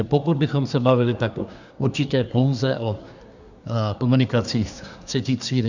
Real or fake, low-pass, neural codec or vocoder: fake; 7.2 kHz; codec, 24 kHz, 1 kbps, SNAC